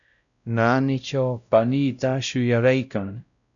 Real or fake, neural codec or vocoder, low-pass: fake; codec, 16 kHz, 0.5 kbps, X-Codec, WavLM features, trained on Multilingual LibriSpeech; 7.2 kHz